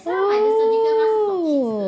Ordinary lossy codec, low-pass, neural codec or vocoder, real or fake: none; none; none; real